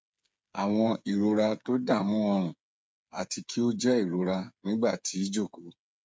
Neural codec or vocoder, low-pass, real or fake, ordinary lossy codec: codec, 16 kHz, 8 kbps, FreqCodec, smaller model; none; fake; none